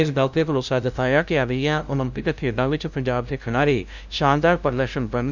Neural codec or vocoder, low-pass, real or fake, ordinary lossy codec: codec, 16 kHz, 0.5 kbps, FunCodec, trained on LibriTTS, 25 frames a second; 7.2 kHz; fake; none